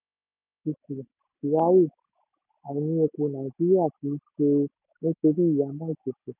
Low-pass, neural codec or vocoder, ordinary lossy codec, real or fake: 3.6 kHz; none; none; real